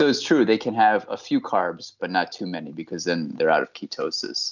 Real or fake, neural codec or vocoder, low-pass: real; none; 7.2 kHz